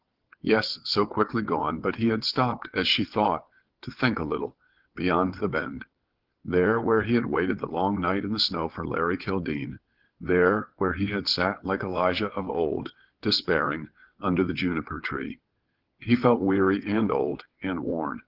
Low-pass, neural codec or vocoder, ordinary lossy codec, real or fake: 5.4 kHz; vocoder, 22.05 kHz, 80 mel bands, WaveNeXt; Opus, 16 kbps; fake